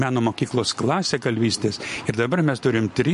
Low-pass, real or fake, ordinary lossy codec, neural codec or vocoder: 14.4 kHz; real; MP3, 48 kbps; none